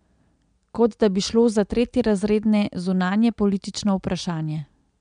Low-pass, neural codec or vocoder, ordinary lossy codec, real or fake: 9.9 kHz; none; MP3, 96 kbps; real